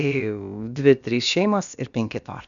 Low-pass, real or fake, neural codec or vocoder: 7.2 kHz; fake; codec, 16 kHz, about 1 kbps, DyCAST, with the encoder's durations